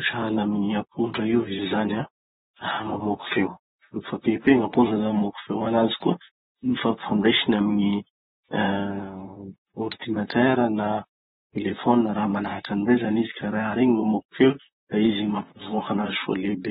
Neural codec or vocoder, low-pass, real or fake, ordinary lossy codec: vocoder, 44.1 kHz, 128 mel bands, Pupu-Vocoder; 19.8 kHz; fake; AAC, 16 kbps